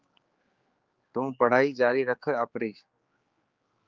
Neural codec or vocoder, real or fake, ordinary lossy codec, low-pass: codec, 16 kHz, 4 kbps, X-Codec, HuBERT features, trained on general audio; fake; Opus, 32 kbps; 7.2 kHz